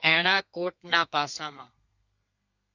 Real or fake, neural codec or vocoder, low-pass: fake; codec, 32 kHz, 1.9 kbps, SNAC; 7.2 kHz